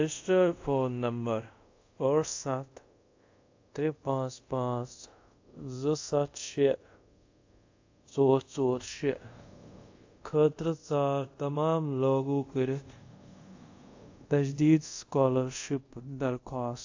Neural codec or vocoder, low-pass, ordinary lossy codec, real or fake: codec, 24 kHz, 0.5 kbps, DualCodec; 7.2 kHz; AAC, 48 kbps; fake